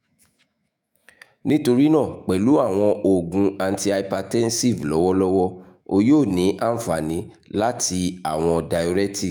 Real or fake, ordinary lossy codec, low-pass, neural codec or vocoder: fake; none; none; autoencoder, 48 kHz, 128 numbers a frame, DAC-VAE, trained on Japanese speech